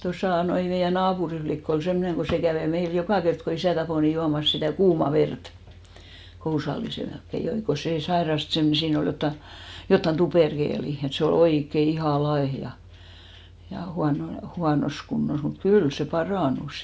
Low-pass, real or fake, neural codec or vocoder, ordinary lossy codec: none; real; none; none